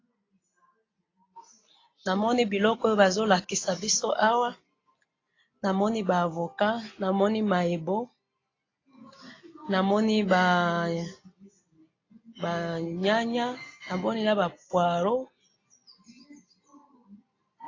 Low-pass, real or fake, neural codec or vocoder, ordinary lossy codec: 7.2 kHz; real; none; AAC, 32 kbps